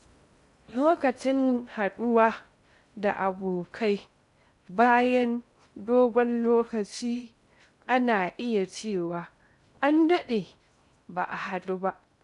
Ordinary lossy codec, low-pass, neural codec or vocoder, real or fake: none; 10.8 kHz; codec, 16 kHz in and 24 kHz out, 0.6 kbps, FocalCodec, streaming, 2048 codes; fake